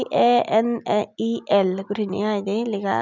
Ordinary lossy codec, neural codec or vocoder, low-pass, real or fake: none; none; 7.2 kHz; real